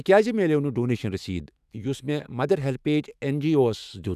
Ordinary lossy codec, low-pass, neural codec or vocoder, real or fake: none; 14.4 kHz; autoencoder, 48 kHz, 128 numbers a frame, DAC-VAE, trained on Japanese speech; fake